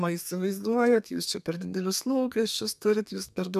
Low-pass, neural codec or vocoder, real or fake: 14.4 kHz; codec, 32 kHz, 1.9 kbps, SNAC; fake